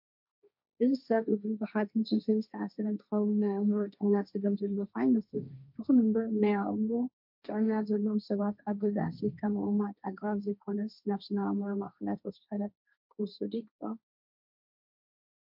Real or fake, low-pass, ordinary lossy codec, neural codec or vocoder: fake; 5.4 kHz; MP3, 48 kbps; codec, 16 kHz, 1.1 kbps, Voila-Tokenizer